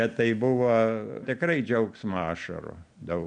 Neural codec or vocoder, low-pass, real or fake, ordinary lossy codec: none; 9.9 kHz; real; MP3, 64 kbps